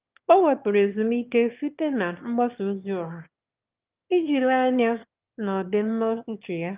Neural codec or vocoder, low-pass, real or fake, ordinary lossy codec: autoencoder, 22.05 kHz, a latent of 192 numbers a frame, VITS, trained on one speaker; 3.6 kHz; fake; Opus, 24 kbps